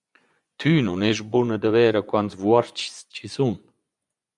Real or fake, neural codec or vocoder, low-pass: fake; vocoder, 24 kHz, 100 mel bands, Vocos; 10.8 kHz